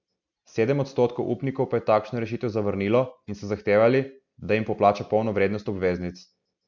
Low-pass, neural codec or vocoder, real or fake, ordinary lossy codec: 7.2 kHz; none; real; none